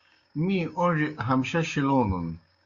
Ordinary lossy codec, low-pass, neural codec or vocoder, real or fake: Opus, 64 kbps; 7.2 kHz; codec, 16 kHz, 6 kbps, DAC; fake